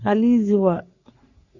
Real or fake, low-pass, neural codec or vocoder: fake; 7.2 kHz; codec, 44.1 kHz, 3.4 kbps, Pupu-Codec